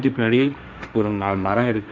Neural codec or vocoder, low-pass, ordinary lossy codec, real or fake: codec, 16 kHz, 1.1 kbps, Voila-Tokenizer; none; none; fake